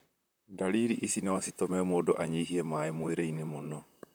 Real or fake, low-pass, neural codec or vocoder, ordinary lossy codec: fake; none; vocoder, 44.1 kHz, 128 mel bands, Pupu-Vocoder; none